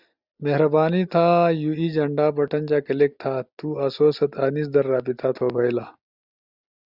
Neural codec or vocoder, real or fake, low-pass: none; real; 5.4 kHz